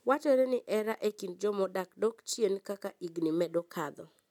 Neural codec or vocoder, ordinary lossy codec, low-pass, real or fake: none; none; 19.8 kHz; real